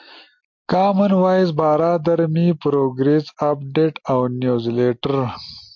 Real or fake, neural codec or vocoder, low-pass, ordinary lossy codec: real; none; 7.2 kHz; MP3, 48 kbps